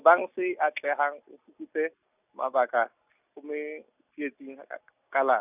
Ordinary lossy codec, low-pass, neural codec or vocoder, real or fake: none; 3.6 kHz; none; real